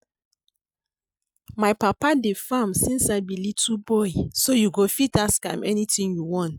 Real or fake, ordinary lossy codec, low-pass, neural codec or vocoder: real; none; none; none